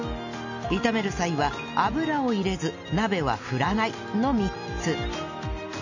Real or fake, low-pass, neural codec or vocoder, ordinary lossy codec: real; 7.2 kHz; none; none